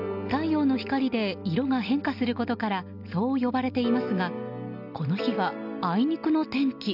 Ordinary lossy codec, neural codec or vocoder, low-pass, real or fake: none; none; 5.4 kHz; real